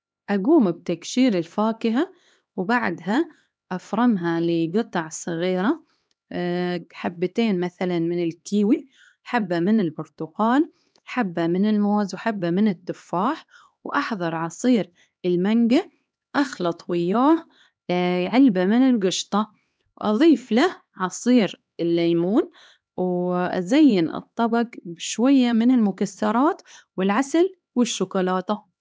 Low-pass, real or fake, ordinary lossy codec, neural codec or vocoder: none; fake; none; codec, 16 kHz, 2 kbps, X-Codec, HuBERT features, trained on LibriSpeech